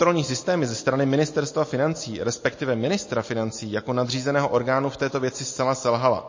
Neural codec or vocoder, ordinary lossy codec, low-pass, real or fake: none; MP3, 32 kbps; 7.2 kHz; real